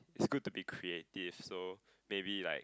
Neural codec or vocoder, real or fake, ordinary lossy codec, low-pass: none; real; none; none